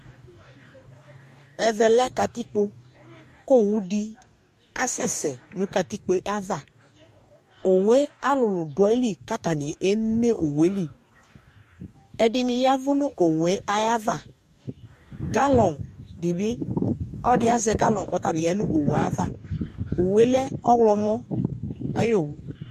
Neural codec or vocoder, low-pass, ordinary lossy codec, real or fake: codec, 44.1 kHz, 2.6 kbps, DAC; 14.4 kHz; MP3, 64 kbps; fake